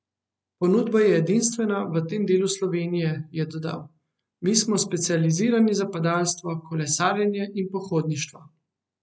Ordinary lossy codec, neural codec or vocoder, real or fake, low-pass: none; none; real; none